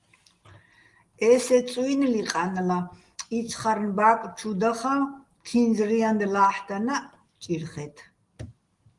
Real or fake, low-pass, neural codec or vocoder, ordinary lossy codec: real; 10.8 kHz; none; Opus, 24 kbps